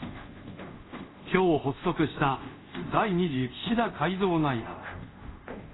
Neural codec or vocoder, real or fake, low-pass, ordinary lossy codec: codec, 24 kHz, 0.5 kbps, DualCodec; fake; 7.2 kHz; AAC, 16 kbps